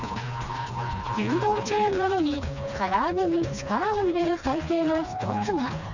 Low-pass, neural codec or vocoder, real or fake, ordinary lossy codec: 7.2 kHz; codec, 16 kHz, 2 kbps, FreqCodec, smaller model; fake; none